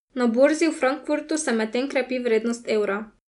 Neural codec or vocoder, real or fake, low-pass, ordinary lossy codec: none; real; 10.8 kHz; none